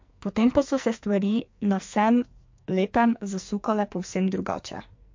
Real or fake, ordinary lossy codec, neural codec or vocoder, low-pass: fake; MP3, 48 kbps; codec, 44.1 kHz, 2.6 kbps, SNAC; 7.2 kHz